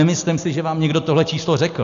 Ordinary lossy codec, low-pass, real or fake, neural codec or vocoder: MP3, 64 kbps; 7.2 kHz; real; none